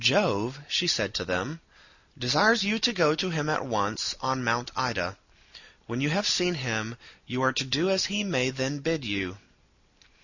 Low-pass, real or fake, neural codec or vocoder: 7.2 kHz; real; none